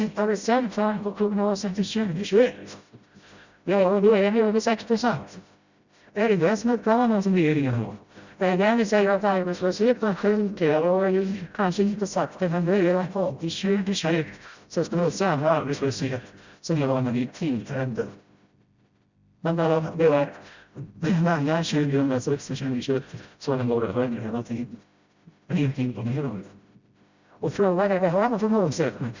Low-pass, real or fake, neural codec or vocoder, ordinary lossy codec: 7.2 kHz; fake; codec, 16 kHz, 0.5 kbps, FreqCodec, smaller model; Opus, 64 kbps